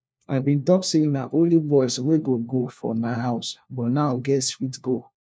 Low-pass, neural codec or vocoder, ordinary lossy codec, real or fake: none; codec, 16 kHz, 1 kbps, FunCodec, trained on LibriTTS, 50 frames a second; none; fake